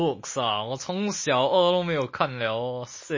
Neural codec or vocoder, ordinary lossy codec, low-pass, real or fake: none; MP3, 32 kbps; 7.2 kHz; real